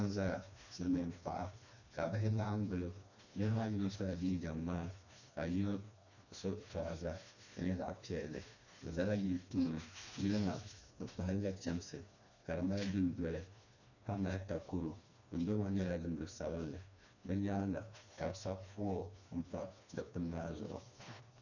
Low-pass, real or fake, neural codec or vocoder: 7.2 kHz; fake; codec, 16 kHz, 2 kbps, FreqCodec, smaller model